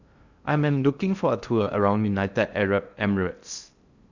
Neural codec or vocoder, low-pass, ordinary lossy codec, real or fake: codec, 16 kHz in and 24 kHz out, 0.6 kbps, FocalCodec, streaming, 2048 codes; 7.2 kHz; Opus, 64 kbps; fake